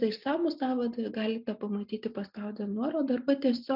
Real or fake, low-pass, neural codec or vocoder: real; 5.4 kHz; none